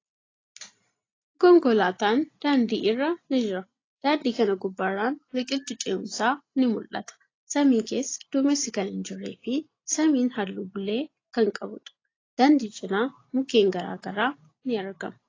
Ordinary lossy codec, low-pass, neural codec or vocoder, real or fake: AAC, 32 kbps; 7.2 kHz; none; real